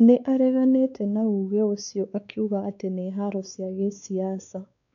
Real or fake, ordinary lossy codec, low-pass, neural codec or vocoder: fake; none; 7.2 kHz; codec, 16 kHz, 4 kbps, X-Codec, WavLM features, trained on Multilingual LibriSpeech